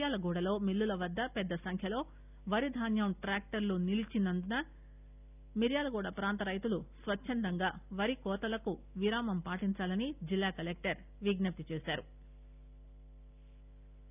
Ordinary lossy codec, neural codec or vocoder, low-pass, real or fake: none; none; 3.6 kHz; real